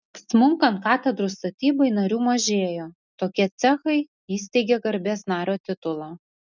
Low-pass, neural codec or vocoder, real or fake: 7.2 kHz; none; real